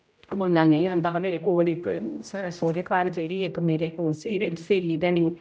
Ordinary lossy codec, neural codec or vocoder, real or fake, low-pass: none; codec, 16 kHz, 0.5 kbps, X-Codec, HuBERT features, trained on general audio; fake; none